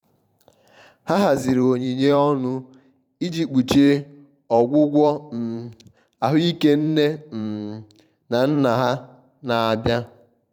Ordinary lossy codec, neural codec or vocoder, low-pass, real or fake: none; vocoder, 44.1 kHz, 128 mel bands every 512 samples, BigVGAN v2; 19.8 kHz; fake